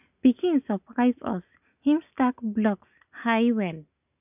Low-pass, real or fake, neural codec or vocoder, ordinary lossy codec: 3.6 kHz; real; none; none